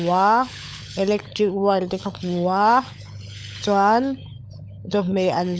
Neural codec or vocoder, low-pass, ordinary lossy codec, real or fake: codec, 16 kHz, 16 kbps, FunCodec, trained on LibriTTS, 50 frames a second; none; none; fake